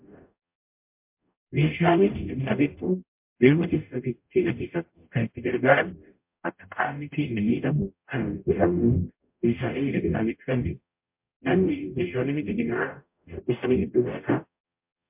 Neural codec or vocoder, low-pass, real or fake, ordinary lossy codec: codec, 44.1 kHz, 0.9 kbps, DAC; 3.6 kHz; fake; MP3, 32 kbps